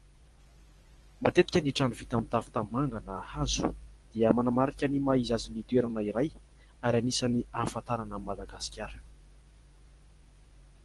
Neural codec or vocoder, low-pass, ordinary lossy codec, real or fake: vocoder, 24 kHz, 100 mel bands, Vocos; 10.8 kHz; Opus, 24 kbps; fake